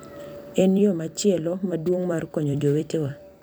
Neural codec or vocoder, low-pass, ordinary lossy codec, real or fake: vocoder, 44.1 kHz, 128 mel bands every 256 samples, BigVGAN v2; none; none; fake